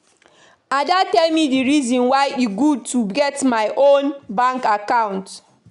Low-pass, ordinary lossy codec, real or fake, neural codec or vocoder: 10.8 kHz; none; real; none